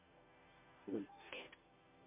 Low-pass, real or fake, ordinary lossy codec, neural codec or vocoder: 3.6 kHz; fake; MP3, 24 kbps; codec, 16 kHz, 0.5 kbps, FunCodec, trained on Chinese and English, 25 frames a second